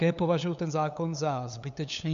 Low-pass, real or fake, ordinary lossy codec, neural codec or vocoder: 7.2 kHz; fake; AAC, 96 kbps; codec, 16 kHz, 8 kbps, FunCodec, trained on LibriTTS, 25 frames a second